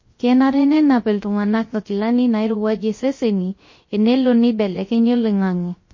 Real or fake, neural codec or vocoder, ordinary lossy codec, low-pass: fake; codec, 16 kHz, 0.3 kbps, FocalCodec; MP3, 32 kbps; 7.2 kHz